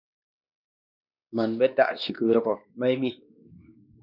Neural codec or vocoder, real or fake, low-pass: codec, 16 kHz, 2 kbps, X-Codec, WavLM features, trained on Multilingual LibriSpeech; fake; 5.4 kHz